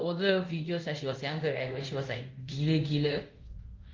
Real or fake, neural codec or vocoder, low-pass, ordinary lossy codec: fake; codec, 24 kHz, 0.5 kbps, DualCodec; 7.2 kHz; Opus, 32 kbps